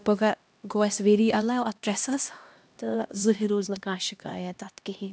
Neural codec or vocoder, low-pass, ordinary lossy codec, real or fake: codec, 16 kHz, 1 kbps, X-Codec, HuBERT features, trained on LibriSpeech; none; none; fake